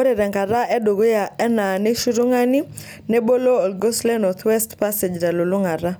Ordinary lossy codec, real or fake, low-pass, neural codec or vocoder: none; real; none; none